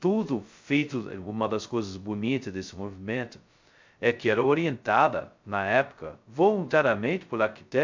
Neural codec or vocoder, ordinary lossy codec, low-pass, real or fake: codec, 16 kHz, 0.2 kbps, FocalCodec; MP3, 64 kbps; 7.2 kHz; fake